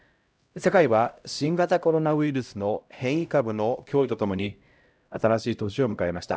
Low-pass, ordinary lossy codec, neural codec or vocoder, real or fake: none; none; codec, 16 kHz, 0.5 kbps, X-Codec, HuBERT features, trained on LibriSpeech; fake